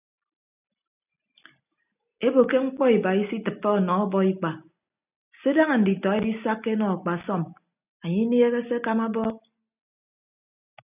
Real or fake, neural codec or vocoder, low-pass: real; none; 3.6 kHz